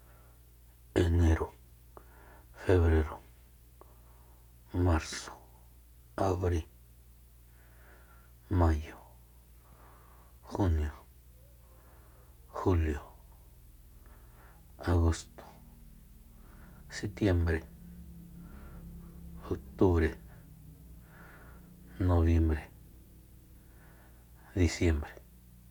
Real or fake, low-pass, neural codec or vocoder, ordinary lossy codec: real; 19.8 kHz; none; none